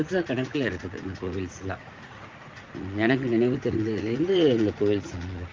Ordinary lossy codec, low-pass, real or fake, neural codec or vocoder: Opus, 32 kbps; 7.2 kHz; fake; vocoder, 44.1 kHz, 80 mel bands, Vocos